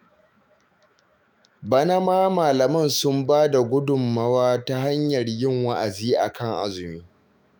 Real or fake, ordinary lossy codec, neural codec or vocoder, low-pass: fake; none; autoencoder, 48 kHz, 128 numbers a frame, DAC-VAE, trained on Japanese speech; none